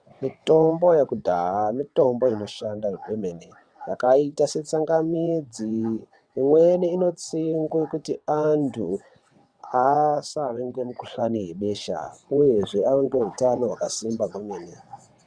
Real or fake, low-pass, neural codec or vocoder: fake; 9.9 kHz; vocoder, 22.05 kHz, 80 mel bands, WaveNeXt